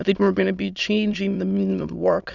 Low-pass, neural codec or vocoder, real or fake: 7.2 kHz; autoencoder, 22.05 kHz, a latent of 192 numbers a frame, VITS, trained on many speakers; fake